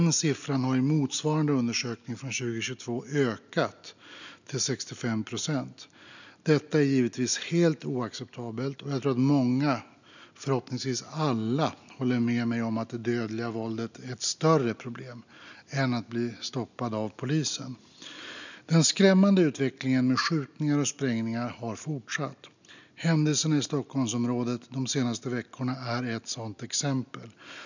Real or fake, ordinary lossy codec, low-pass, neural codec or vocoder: real; none; 7.2 kHz; none